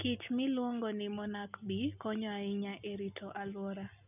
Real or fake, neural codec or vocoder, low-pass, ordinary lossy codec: real; none; 3.6 kHz; none